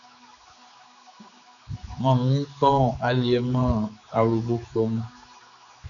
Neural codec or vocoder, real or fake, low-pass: codec, 16 kHz, 4 kbps, X-Codec, HuBERT features, trained on general audio; fake; 7.2 kHz